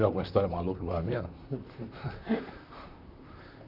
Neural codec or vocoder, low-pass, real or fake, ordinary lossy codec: vocoder, 44.1 kHz, 128 mel bands, Pupu-Vocoder; 5.4 kHz; fake; none